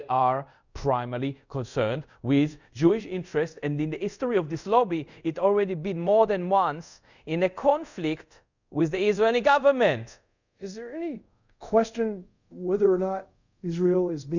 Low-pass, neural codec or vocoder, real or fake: 7.2 kHz; codec, 24 kHz, 0.5 kbps, DualCodec; fake